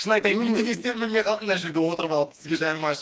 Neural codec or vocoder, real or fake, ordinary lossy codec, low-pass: codec, 16 kHz, 2 kbps, FreqCodec, smaller model; fake; none; none